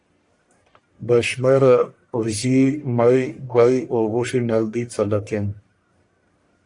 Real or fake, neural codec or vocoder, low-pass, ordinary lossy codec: fake; codec, 44.1 kHz, 1.7 kbps, Pupu-Codec; 10.8 kHz; AAC, 64 kbps